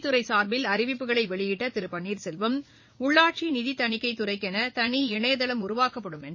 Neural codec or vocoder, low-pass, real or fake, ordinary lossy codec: vocoder, 44.1 kHz, 80 mel bands, Vocos; 7.2 kHz; fake; MP3, 32 kbps